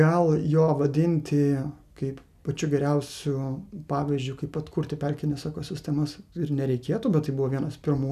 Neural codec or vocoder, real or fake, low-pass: none; real; 14.4 kHz